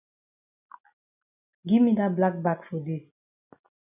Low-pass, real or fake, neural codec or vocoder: 3.6 kHz; real; none